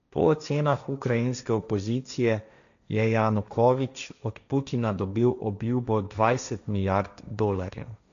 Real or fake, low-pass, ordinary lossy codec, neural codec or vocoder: fake; 7.2 kHz; none; codec, 16 kHz, 1.1 kbps, Voila-Tokenizer